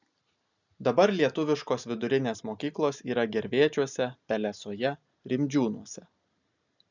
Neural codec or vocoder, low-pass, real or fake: none; 7.2 kHz; real